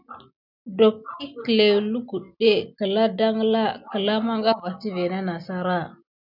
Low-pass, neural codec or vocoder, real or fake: 5.4 kHz; none; real